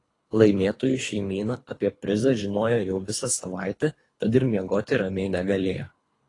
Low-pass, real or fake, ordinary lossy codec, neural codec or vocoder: 10.8 kHz; fake; AAC, 32 kbps; codec, 24 kHz, 3 kbps, HILCodec